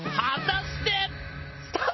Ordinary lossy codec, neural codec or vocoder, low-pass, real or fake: MP3, 24 kbps; none; 7.2 kHz; real